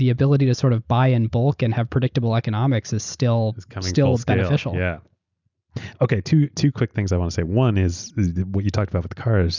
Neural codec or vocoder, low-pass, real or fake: none; 7.2 kHz; real